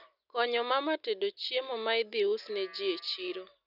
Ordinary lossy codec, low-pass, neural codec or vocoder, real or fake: none; 5.4 kHz; none; real